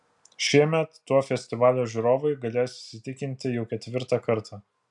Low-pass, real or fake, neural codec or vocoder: 10.8 kHz; real; none